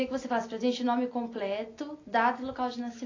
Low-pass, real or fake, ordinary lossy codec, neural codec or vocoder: 7.2 kHz; real; AAC, 32 kbps; none